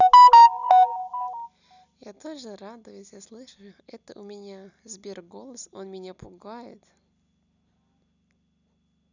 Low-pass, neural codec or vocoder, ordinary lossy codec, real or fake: 7.2 kHz; none; none; real